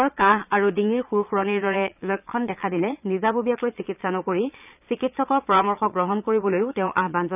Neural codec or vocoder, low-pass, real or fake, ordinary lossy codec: vocoder, 22.05 kHz, 80 mel bands, Vocos; 3.6 kHz; fake; none